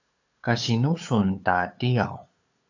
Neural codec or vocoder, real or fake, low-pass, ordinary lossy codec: codec, 16 kHz, 8 kbps, FunCodec, trained on LibriTTS, 25 frames a second; fake; 7.2 kHz; AAC, 48 kbps